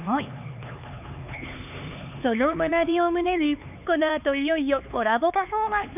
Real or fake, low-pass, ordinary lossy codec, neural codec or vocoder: fake; 3.6 kHz; none; codec, 16 kHz, 4 kbps, X-Codec, HuBERT features, trained on LibriSpeech